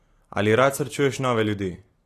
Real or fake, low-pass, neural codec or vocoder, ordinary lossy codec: real; 14.4 kHz; none; AAC, 48 kbps